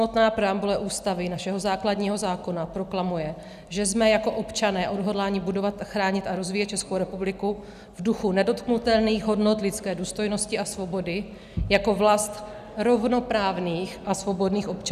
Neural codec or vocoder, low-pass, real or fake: none; 14.4 kHz; real